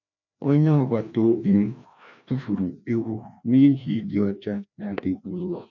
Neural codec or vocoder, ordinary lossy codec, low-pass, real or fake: codec, 16 kHz, 1 kbps, FreqCodec, larger model; none; 7.2 kHz; fake